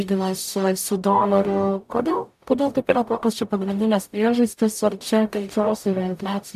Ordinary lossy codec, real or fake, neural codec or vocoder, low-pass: AAC, 96 kbps; fake; codec, 44.1 kHz, 0.9 kbps, DAC; 14.4 kHz